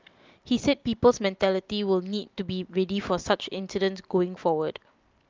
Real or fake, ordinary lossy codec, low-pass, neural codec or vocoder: real; Opus, 24 kbps; 7.2 kHz; none